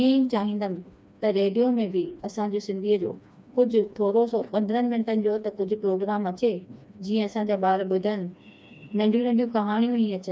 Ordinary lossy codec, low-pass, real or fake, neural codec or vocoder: none; none; fake; codec, 16 kHz, 2 kbps, FreqCodec, smaller model